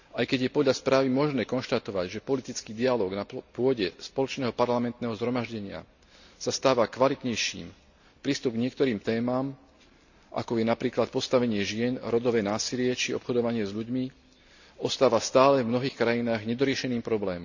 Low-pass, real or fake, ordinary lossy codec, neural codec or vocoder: 7.2 kHz; real; none; none